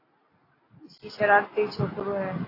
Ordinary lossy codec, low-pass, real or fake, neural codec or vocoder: AAC, 24 kbps; 5.4 kHz; real; none